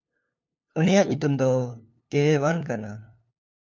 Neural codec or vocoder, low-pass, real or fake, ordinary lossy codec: codec, 16 kHz, 2 kbps, FunCodec, trained on LibriTTS, 25 frames a second; 7.2 kHz; fake; MP3, 64 kbps